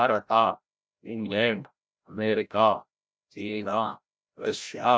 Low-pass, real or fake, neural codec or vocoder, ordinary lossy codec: none; fake; codec, 16 kHz, 0.5 kbps, FreqCodec, larger model; none